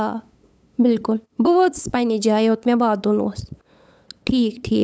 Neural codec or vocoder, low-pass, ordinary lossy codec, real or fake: codec, 16 kHz, 8 kbps, FunCodec, trained on LibriTTS, 25 frames a second; none; none; fake